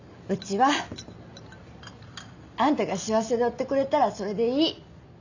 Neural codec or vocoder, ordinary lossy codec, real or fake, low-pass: none; none; real; 7.2 kHz